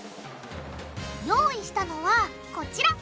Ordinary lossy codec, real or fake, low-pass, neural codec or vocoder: none; real; none; none